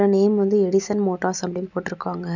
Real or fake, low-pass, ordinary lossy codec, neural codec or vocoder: real; 7.2 kHz; none; none